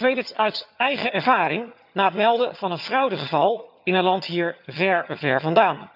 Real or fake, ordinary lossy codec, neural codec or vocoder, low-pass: fake; none; vocoder, 22.05 kHz, 80 mel bands, HiFi-GAN; 5.4 kHz